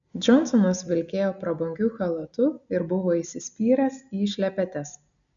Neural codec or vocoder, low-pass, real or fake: none; 7.2 kHz; real